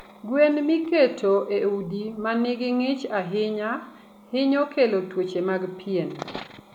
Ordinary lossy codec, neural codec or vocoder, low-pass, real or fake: none; none; 19.8 kHz; real